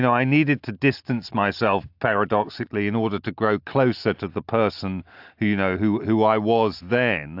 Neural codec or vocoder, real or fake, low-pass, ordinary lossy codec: none; real; 5.4 kHz; AAC, 48 kbps